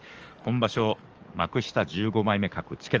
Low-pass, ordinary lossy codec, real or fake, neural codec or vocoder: 7.2 kHz; Opus, 24 kbps; fake; codec, 44.1 kHz, 7.8 kbps, DAC